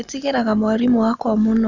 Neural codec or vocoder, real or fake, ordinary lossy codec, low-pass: none; real; none; 7.2 kHz